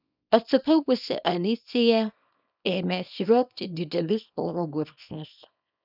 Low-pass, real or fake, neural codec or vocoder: 5.4 kHz; fake; codec, 24 kHz, 0.9 kbps, WavTokenizer, small release